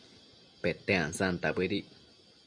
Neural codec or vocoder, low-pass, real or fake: none; 9.9 kHz; real